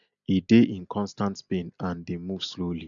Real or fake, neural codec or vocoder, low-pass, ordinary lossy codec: real; none; 7.2 kHz; none